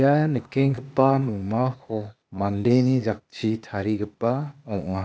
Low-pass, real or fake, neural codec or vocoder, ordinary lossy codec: none; fake; codec, 16 kHz, 0.8 kbps, ZipCodec; none